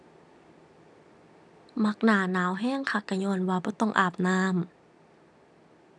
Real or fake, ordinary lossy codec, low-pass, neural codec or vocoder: real; none; none; none